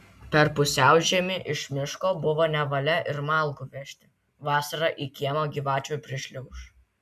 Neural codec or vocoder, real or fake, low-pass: none; real; 14.4 kHz